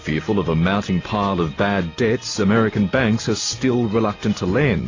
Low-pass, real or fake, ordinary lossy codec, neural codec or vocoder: 7.2 kHz; real; AAC, 32 kbps; none